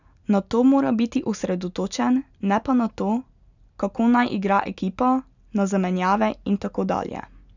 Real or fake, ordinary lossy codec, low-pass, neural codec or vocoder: real; none; 7.2 kHz; none